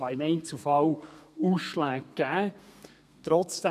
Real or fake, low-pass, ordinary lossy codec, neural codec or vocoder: fake; 14.4 kHz; none; codec, 32 kHz, 1.9 kbps, SNAC